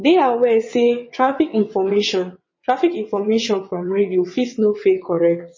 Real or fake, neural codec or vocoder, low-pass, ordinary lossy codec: fake; vocoder, 22.05 kHz, 80 mel bands, WaveNeXt; 7.2 kHz; MP3, 32 kbps